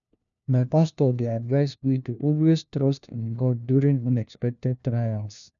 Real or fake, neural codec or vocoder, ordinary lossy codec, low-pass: fake; codec, 16 kHz, 1 kbps, FunCodec, trained on LibriTTS, 50 frames a second; none; 7.2 kHz